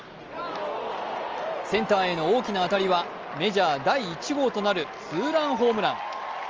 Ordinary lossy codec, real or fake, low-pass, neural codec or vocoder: Opus, 24 kbps; real; 7.2 kHz; none